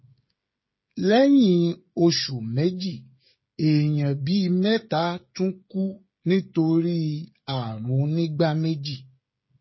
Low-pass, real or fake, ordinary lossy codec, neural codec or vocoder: 7.2 kHz; fake; MP3, 24 kbps; codec, 16 kHz, 16 kbps, FreqCodec, smaller model